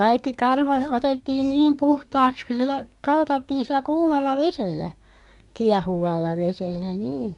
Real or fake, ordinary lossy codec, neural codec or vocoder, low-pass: fake; none; codec, 24 kHz, 1 kbps, SNAC; 10.8 kHz